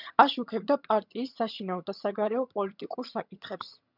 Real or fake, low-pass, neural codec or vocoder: fake; 5.4 kHz; vocoder, 22.05 kHz, 80 mel bands, HiFi-GAN